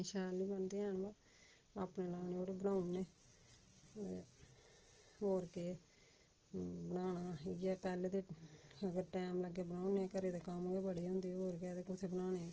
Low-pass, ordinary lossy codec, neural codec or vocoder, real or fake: 7.2 kHz; Opus, 16 kbps; none; real